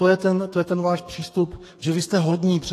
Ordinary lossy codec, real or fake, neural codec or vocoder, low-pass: AAC, 48 kbps; fake; codec, 32 kHz, 1.9 kbps, SNAC; 14.4 kHz